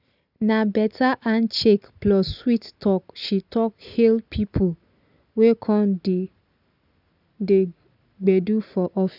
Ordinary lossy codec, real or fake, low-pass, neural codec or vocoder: none; real; 5.4 kHz; none